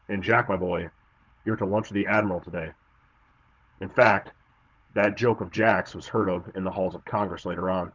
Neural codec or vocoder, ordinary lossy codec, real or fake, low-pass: codec, 16 kHz, 16 kbps, FunCodec, trained on Chinese and English, 50 frames a second; Opus, 32 kbps; fake; 7.2 kHz